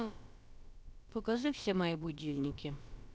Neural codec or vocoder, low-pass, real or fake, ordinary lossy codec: codec, 16 kHz, about 1 kbps, DyCAST, with the encoder's durations; none; fake; none